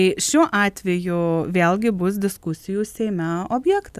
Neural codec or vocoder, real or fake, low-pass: none; real; 14.4 kHz